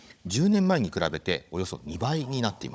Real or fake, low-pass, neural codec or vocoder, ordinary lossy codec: fake; none; codec, 16 kHz, 16 kbps, FunCodec, trained on Chinese and English, 50 frames a second; none